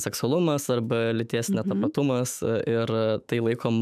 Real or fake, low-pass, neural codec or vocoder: fake; 14.4 kHz; autoencoder, 48 kHz, 128 numbers a frame, DAC-VAE, trained on Japanese speech